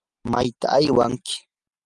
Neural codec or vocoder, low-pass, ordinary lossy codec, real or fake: none; 10.8 kHz; Opus, 32 kbps; real